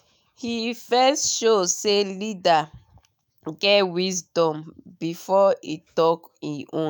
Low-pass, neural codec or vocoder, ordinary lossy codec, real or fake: none; autoencoder, 48 kHz, 128 numbers a frame, DAC-VAE, trained on Japanese speech; none; fake